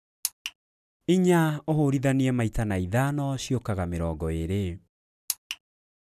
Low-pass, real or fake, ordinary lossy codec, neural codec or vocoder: 14.4 kHz; real; none; none